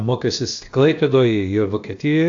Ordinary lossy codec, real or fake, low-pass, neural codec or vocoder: AAC, 48 kbps; fake; 7.2 kHz; codec, 16 kHz, about 1 kbps, DyCAST, with the encoder's durations